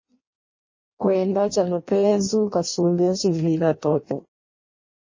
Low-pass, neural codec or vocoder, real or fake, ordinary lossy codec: 7.2 kHz; codec, 16 kHz in and 24 kHz out, 0.6 kbps, FireRedTTS-2 codec; fake; MP3, 32 kbps